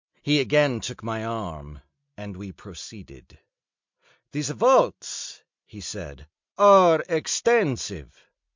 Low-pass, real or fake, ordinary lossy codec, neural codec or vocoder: 7.2 kHz; real; MP3, 64 kbps; none